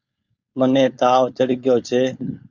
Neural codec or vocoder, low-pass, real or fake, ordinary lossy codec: codec, 16 kHz, 4.8 kbps, FACodec; 7.2 kHz; fake; Opus, 64 kbps